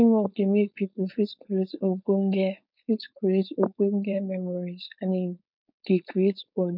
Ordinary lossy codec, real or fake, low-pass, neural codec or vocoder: none; fake; 5.4 kHz; codec, 16 kHz, 4.8 kbps, FACodec